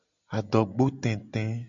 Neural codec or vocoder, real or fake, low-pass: none; real; 7.2 kHz